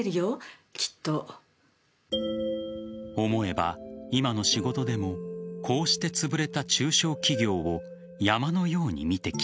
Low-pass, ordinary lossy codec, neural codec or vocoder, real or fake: none; none; none; real